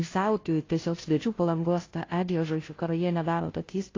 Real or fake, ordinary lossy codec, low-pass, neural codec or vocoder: fake; AAC, 32 kbps; 7.2 kHz; codec, 16 kHz, 0.5 kbps, FunCodec, trained on Chinese and English, 25 frames a second